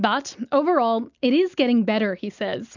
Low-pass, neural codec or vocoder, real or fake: 7.2 kHz; none; real